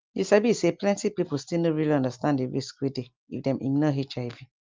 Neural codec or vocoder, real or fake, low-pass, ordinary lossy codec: none; real; 7.2 kHz; Opus, 24 kbps